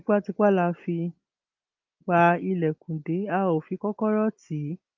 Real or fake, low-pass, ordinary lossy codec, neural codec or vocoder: real; 7.2 kHz; Opus, 24 kbps; none